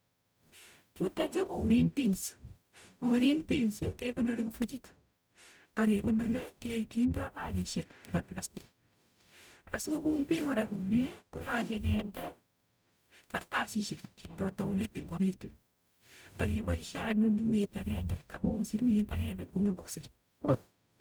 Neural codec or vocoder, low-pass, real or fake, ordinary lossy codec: codec, 44.1 kHz, 0.9 kbps, DAC; none; fake; none